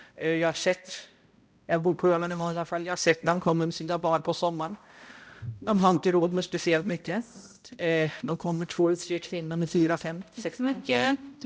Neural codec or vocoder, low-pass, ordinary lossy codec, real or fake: codec, 16 kHz, 0.5 kbps, X-Codec, HuBERT features, trained on balanced general audio; none; none; fake